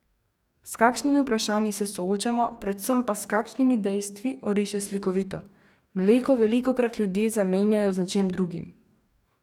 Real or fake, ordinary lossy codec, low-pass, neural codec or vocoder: fake; none; 19.8 kHz; codec, 44.1 kHz, 2.6 kbps, DAC